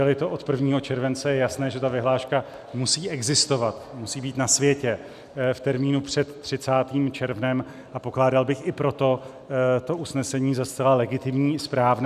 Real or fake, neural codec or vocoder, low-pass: real; none; 14.4 kHz